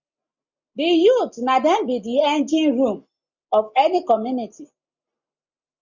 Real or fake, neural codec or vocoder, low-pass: real; none; 7.2 kHz